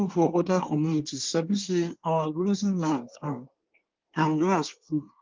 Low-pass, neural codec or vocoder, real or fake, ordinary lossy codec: 7.2 kHz; codec, 24 kHz, 1 kbps, SNAC; fake; Opus, 32 kbps